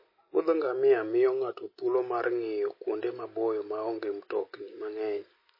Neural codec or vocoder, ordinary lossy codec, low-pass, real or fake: none; MP3, 24 kbps; 5.4 kHz; real